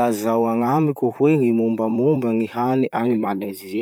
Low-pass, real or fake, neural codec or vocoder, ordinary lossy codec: none; fake; vocoder, 44.1 kHz, 128 mel bands, Pupu-Vocoder; none